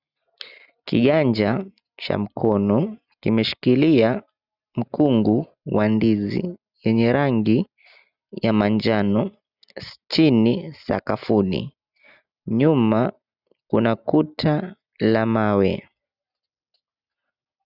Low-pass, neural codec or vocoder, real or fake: 5.4 kHz; none; real